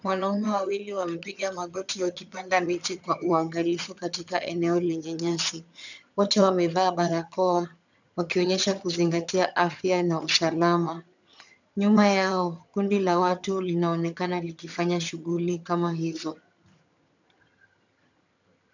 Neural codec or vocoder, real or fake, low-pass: vocoder, 22.05 kHz, 80 mel bands, HiFi-GAN; fake; 7.2 kHz